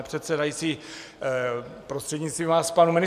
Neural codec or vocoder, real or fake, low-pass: none; real; 14.4 kHz